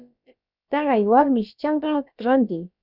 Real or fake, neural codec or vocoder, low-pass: fake; codec, 16 kHz, about 1 kbps, DyCAST, with the encoder's durations; 5.4 kHz